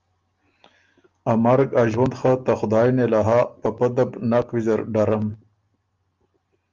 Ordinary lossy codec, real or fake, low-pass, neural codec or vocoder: Opus, 24 kbps; real; 7.2 kHz; none